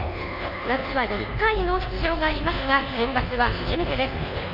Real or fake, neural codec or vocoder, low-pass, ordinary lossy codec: fake; codec, 24 kHz, 1.2 kbps, DualCodec; 5.4 kHz; none